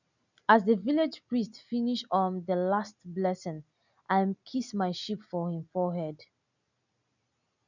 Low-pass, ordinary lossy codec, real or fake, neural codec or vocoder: 7.2 kHz; none; real; none